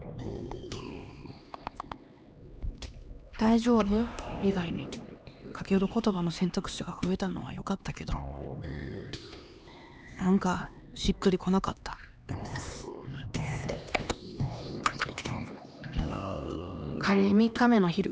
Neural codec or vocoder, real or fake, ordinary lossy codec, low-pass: codec, 16 kHz, 2 kbps, X-Codec, HuBERT features, trained on LibriSpeech; fake; none; none